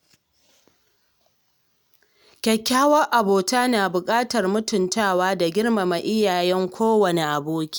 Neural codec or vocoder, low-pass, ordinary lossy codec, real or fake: none; none; none; real